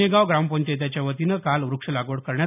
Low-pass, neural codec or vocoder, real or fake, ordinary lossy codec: 3.6 kHz; none; real; none